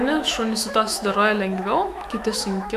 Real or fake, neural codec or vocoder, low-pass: fake; autoencoder, 48 kHz, 128 numbers a frame, DAC-VAE, trained on Japanese speech; 14.4 kHz